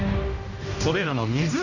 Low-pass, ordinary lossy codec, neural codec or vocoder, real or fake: 7.2 kHz; none; codec, 16 kHz, 1 kbps, X-Codec, HuBERT features, trained on general audio; fake